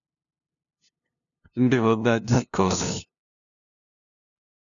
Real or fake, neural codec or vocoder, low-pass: fake; codec, 16 kHz, 0.5 kbps, FunCodec, trained on LibriTTS, 25 frames a second; 7.2 kHz